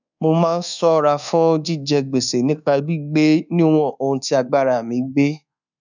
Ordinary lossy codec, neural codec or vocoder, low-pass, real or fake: none; codec, 24 kHz, 1.2 kbps, DualCodec; 7.2 kHz; fake